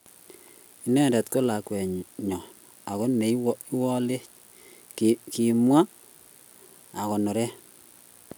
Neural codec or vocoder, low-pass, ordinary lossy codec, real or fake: none; none; none; real